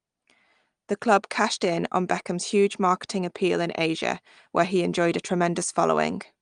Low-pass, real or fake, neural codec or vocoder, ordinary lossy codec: 9.9 kHz; real; none; Opus, 32 kbps